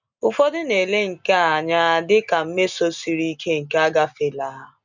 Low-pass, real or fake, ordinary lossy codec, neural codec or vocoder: 7.2 kHz; real; none; none